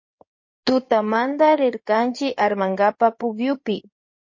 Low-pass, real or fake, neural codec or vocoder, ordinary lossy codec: 7.2 kHz; fake; codec, 16 kHz in and 24 kHz out, 2.2 kbps, FireRedTTS-2 codec; MP3, 32 kbps